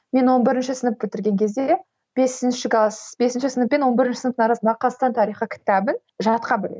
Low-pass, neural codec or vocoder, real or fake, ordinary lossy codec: none; none; real; none